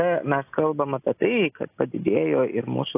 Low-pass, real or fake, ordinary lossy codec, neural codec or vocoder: 3.6 kHz; real; AAC, 24 kbps; none